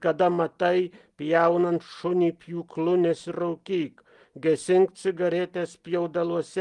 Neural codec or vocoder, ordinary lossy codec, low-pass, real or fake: none; Opus, 24 kbps; 10.8 kHz; real